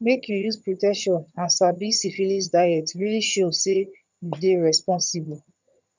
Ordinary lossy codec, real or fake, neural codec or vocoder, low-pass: none; fake; vocoder, 22.05 kHz, 80 mel bands, HiFi-GAN; 7.2 kHz